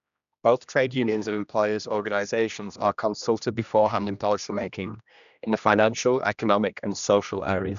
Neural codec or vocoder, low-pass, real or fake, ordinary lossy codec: codec, 16 kHz, 1 kbps, X-Codec, HuBERT features, trained on general audio; 7.2 kHz; fake; none